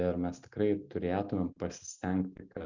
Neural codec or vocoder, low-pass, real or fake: none; 7.2 kHz; real